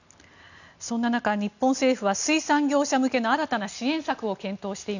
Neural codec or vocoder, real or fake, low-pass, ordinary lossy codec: none; real; 7.2 kHz; none